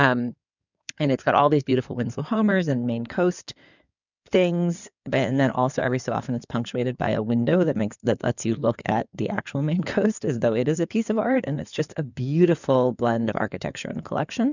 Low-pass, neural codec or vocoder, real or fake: 7.2 kHz; codec, 16 kHz in and 24 kHz out, 2.2 kbps, FireRedTTS-2 codec; fake